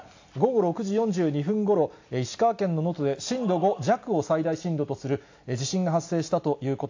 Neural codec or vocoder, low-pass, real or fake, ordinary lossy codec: none; 7.2 kHz; real; AAC, 48 kbps